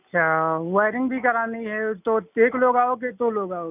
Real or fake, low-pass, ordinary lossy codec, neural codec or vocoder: real; 3.6 kHz; none; none